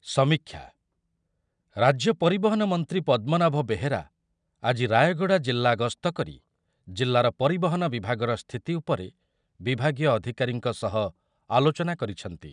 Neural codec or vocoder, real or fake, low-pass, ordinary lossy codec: none; real; 9.9 kHz; none